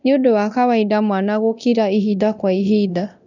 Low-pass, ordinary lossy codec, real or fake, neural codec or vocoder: 7.2 kHz; none; fake; codec, 24 kHz, 0.9 kbps, DualCodec